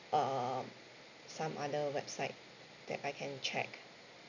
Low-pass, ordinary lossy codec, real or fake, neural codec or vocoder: 7.2 kHz; none; real; none